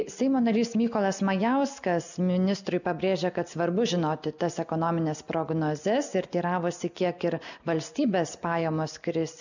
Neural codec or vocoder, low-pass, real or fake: none; 7.2 kHz; real